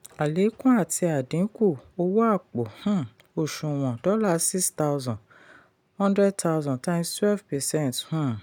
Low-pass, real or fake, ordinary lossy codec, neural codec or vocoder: 19.8 kHz; real; none; none